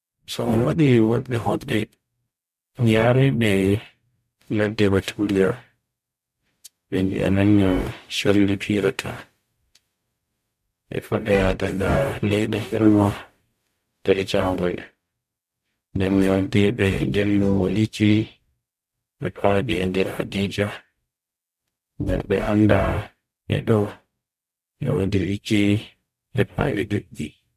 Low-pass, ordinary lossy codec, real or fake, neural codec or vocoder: 14.4 kHz; MP3, 96 kbps; fake; codec, 44.1 kHz, 0.9 kbps, DAC